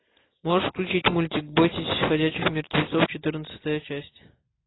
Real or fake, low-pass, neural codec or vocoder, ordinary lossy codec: real; 7.2 kHz; none; AAC, 16 kbps